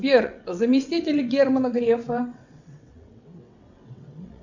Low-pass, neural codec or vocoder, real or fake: 7.2 kHz; vocoder, 22.05 kHz, 80 mel bands, WaveNeXt; fake